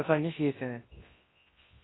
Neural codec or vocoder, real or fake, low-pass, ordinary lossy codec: codec, 24 kHz, 0.9 kbps, WavTokenizer, large speech release; fake; 7.2 kHz; AAC, 16 kbps